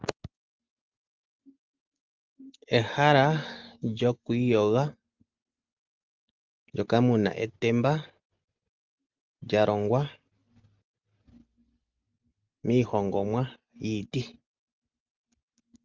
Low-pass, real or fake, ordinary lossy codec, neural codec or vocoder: 7.2 kHz; real; Opus, 24 kbps; none